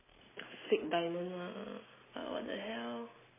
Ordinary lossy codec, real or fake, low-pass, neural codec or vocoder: MP3, 16 kbps; real; 3.6 kHz; none